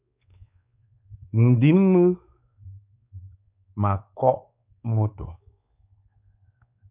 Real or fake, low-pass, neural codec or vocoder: fake; 3.6 kHz; codec, 16 kHz, 4 kbps, X-Codec, WavLM features, trained on Multilingual LibriSpeech